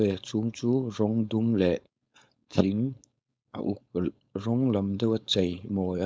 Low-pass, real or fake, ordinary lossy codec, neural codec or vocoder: none; fake; none; codec, 16 kHz, 4.8 kbps, FACodec